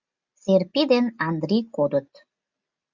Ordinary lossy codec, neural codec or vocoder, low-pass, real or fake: Opus, 64 kbps; none; 7.2 kHz; real